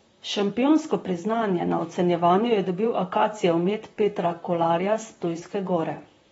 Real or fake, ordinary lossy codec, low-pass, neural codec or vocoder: fake; AAC, 24 kbps; 19.8 kHz; vocoder, 44.1 kHz, 128 mel bands, Pupu-Vocoder